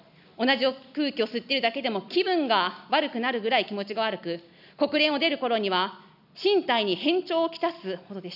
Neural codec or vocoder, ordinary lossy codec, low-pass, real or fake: none; none; 5.4 kHz; real